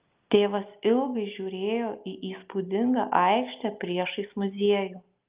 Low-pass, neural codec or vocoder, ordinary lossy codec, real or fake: 3.6 kHz; none; Opus, 32 kbps; real